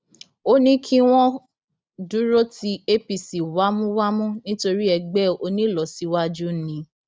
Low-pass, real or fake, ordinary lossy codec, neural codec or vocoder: none; real; none; none